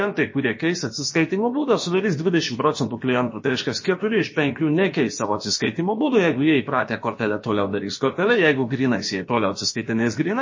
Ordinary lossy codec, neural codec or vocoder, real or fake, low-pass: MP3, 32 kbps; codec, 16 kHz, about 1 kbps, DyCAST, with the encoder's durations; fake; 7.2 kHz